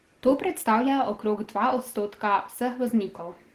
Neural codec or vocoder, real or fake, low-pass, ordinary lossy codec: none; real; 14.4 kHz; Opus, 16 kbps